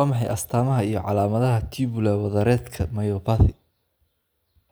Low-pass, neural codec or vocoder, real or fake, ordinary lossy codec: none; none; real; none